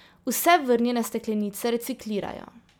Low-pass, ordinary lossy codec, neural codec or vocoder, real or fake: none; none; none; real